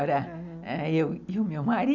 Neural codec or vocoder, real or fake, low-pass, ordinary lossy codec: none; real; 7.2 kHz; none